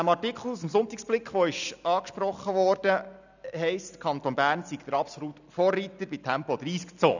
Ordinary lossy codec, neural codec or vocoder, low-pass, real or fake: none; none; 7.2 kHz; real